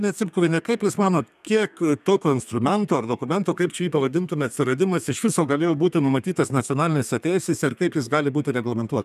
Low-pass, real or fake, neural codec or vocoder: 14.4 kHz; fake; codec, 32 kHz, 1.9 kbps, SNAC